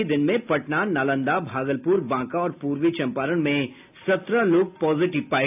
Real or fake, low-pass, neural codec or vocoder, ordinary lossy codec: real; 3.6 kHz; none; AAC, 32 kbps